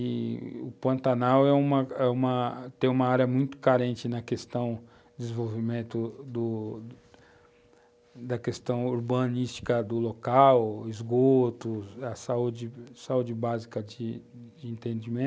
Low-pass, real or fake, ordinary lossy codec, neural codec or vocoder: none; real; none; none